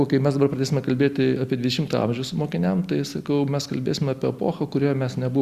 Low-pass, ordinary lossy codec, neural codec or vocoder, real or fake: 14.4 kHz; Opus, 64 kbps; none; real